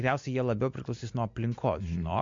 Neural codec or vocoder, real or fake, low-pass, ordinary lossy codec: none; real; 7.2 kHz; MP3, 48 kbps